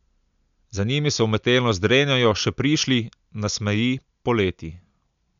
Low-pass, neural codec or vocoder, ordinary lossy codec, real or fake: 7.2 kHz; none; none; real